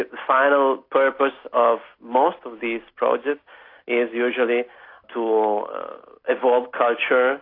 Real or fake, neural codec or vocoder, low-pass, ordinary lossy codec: real; none; 5.4 kHz; AAC, 32 kbps